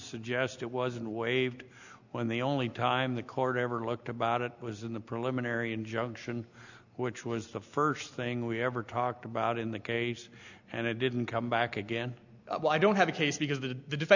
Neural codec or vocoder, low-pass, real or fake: none; 7.2 kHz; real